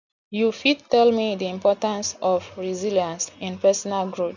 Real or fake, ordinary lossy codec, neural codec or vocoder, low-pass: real; none; none; 7.2 kHz